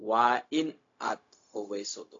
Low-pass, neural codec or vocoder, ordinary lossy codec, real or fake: 7.2 kHz; codec, 16 kHz, 0.4 kbps, LongCat-Audio-Codec; AAC, 48 kbps; fake